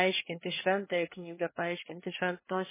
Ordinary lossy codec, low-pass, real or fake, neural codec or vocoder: MP3, 16 kbps; 3.6 kHz; fake; codec, 24 kHz, 1 kbps, SNAC